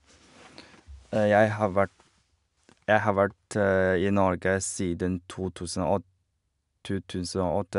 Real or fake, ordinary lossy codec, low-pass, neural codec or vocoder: real; none; 10.8 kHz; none